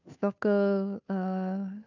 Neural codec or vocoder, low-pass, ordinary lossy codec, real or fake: codec, 16 kHz, 2 kbps, FunCodec, trained on Chinese and English, 25 frames a second; 7.2 kHz; none; fake